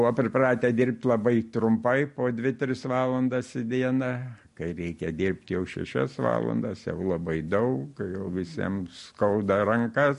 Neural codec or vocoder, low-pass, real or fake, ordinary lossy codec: none; 10.8 kHz; real; MP3, 48 kbps